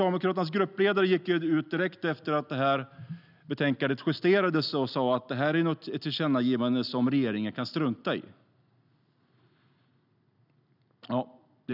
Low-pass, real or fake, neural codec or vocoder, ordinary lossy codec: 5.4 kHz; real; none; none